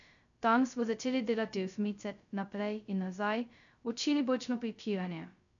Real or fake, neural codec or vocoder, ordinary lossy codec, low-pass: fake; codec, 16 kHz, 0.2 kbps, FocalCodec; none; 7.2 kHz